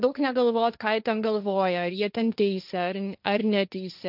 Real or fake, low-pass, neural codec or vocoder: fake; 5.4 kHz; codec, 16 kHz, 1.1 kbps, Voila-Tokenizer